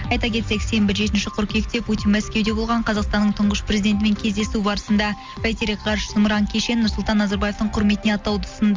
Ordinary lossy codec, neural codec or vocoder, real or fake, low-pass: Opus, 32 kbps; none; real; 7.2 kHz